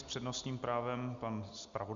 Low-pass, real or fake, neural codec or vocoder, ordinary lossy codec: 7.2 kHz; real; none; Opus, 64 kbps